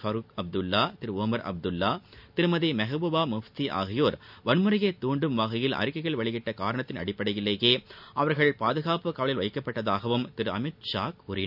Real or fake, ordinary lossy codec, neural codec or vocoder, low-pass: real; none; none; 5.4 kHz